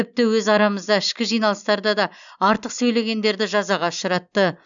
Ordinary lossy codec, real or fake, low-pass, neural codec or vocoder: none; real; 7.2 kHz; none